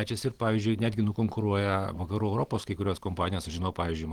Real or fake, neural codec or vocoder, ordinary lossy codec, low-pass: real; none; Opus, 16 kbps; 19.8 kHz